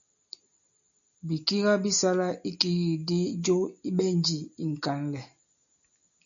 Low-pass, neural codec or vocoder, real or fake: 7.2 kHz; none; real